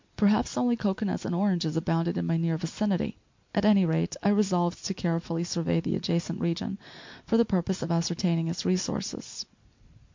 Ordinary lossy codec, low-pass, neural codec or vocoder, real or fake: MP3, 48 kbps; 7.2 kHz; none; real